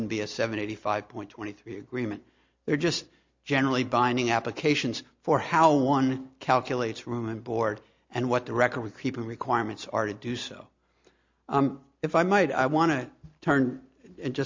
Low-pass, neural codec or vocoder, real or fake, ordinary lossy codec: 7.2 kHz; none; real; MP3, 48 kbps